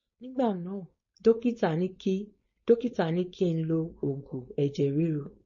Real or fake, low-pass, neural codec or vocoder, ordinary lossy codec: fake; 7.2 kHz; codec, 16 kHz, 4.8 kbps, FACodec; MP3, 32 kbps